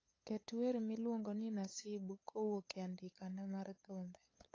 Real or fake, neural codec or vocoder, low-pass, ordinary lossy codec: fake; codec, 16 kHz, 8 kbps, FunCodec, trained on LibriTTS, 25 frames a second; 7.2 kHz; AAC, 32 kbps